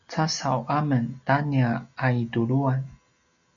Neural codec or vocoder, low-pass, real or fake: none; 7.2 kHz; real